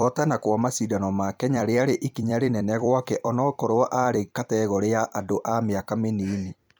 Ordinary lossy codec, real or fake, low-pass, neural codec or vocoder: none; fake; none; vocoder, 44.1 kHz, 128 mel bands every 256 samples, BigVGAN v2